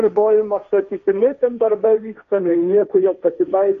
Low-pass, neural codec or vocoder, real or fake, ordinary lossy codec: 7.2 kHz; codec, 16 kHz, 1.1 kbps, Voila-Tokenizer; fake; MP3, 64 kbps